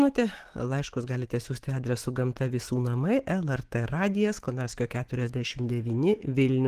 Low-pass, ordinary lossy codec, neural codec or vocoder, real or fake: 14.4 kHz; Opus, 16 kbps; autoencoder, 48 kHz, 128 numbers a frame, DAC-VAE, trained on Japanese speech; fake